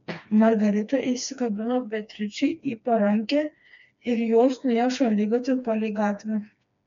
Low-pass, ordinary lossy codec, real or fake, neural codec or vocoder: 7.2 kHz; MP3, 64 kbps; fake; codec, 16 kHz, 2 kbps, FreqCodec, smaller model